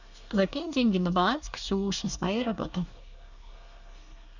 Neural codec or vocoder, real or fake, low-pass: codec, 24 kHz, 1 kbps, SNAC; fake; 7.2 kHz